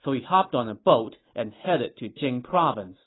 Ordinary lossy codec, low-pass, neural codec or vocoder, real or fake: AAC, 16 kbps; 7.2 kHz; none; real